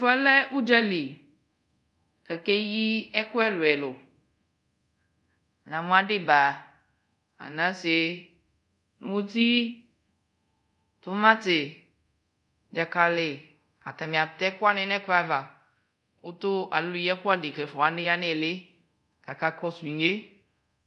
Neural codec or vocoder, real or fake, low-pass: codec, 24 kHz, 0.5 kbps, DualCodec; fake; 10.8 kHz